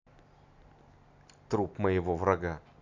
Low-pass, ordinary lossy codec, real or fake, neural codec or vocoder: 7.2 kHz; none; real; none